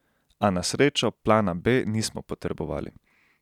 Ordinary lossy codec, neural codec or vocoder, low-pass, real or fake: none; none; 19.8 kHz; real